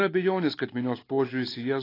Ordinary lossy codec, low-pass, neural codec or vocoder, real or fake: AAC, 24 kbps; 5.4 kHz; none; real